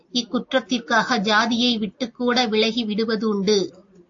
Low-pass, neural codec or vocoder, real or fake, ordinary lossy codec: 7.2 kHz; none; real; AAC, 48 kbps